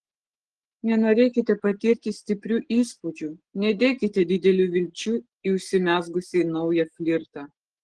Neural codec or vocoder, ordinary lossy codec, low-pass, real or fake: none; Opus, 16 kbps; 9.9 kHz; real